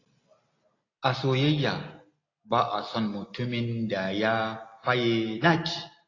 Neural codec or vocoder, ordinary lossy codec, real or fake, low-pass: none; AAC, 32 kbps; real; 7.2 kHz